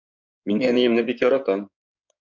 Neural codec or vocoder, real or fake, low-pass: codec, 16 kHz in and 24 kHz out, 2.2 kbps, FireRedTTS-2 codec; fake; 7.2 kHz